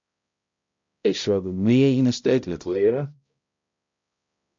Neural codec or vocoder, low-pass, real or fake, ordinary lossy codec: codec, 16 kHz, 0.5 kbps, X-Codec, HuBERT features, trained on balanced general audio; 7.2 kHz; fake; MP3, 48 kbps